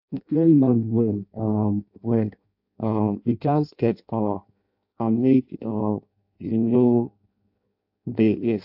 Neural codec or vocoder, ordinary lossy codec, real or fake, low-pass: codec, 16 kHz in and 24 kHz out, 0.6 kbps, FireRedTTS-2 codec; AAC, 48 kbps; fake; 5.4 kHz